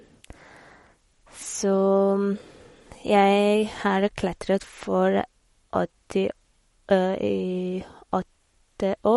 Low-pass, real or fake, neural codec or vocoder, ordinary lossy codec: 19.8 kHz; fake; codec, 44.1 kHz, 7.8 kbps, Pupu-Codec; MP3, 48 kbps